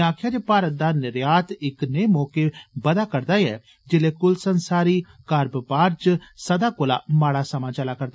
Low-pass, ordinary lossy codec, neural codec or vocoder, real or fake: none; none; none; real